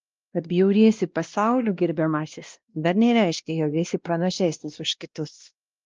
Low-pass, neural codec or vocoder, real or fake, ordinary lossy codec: 7.2 kHz; codec, 16 kHz, 1 kbps, X-Codec, WavLM features, trained on Multilingual LibriSpeech; fake; Opus, 24 kbps